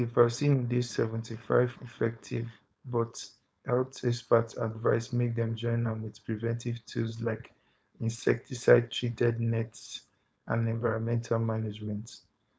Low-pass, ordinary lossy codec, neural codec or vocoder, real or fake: none; none; codec, 16 kHz, 4.8 kbps, FACodec; fake